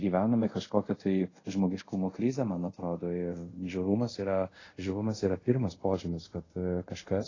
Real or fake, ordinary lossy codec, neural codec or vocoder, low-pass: fake; AAC, 32 kbps; codec, 24 kHz, 0.5 kbps, DualCodec; 7.2 kHz